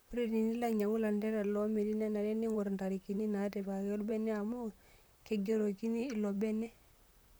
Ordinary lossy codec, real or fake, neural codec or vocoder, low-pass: none; fake; vocoder, 44.1 kHz, 128 mel bands, Pupu-Vocoder; none